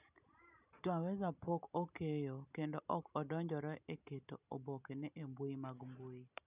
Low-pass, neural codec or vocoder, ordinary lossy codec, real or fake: 3.6 kHz; none; none; real